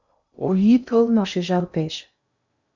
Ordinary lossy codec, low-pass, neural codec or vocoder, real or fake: Opus, 64 kbps; 7.2 kHz; codec, 16 kHz in and 24 kHz out, 0.6 kbps, FocalCodec, streaming, 2048 codes; fake